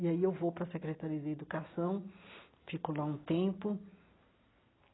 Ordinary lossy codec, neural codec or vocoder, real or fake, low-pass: AAC, 16 kbps; none; real; 7.2 kHz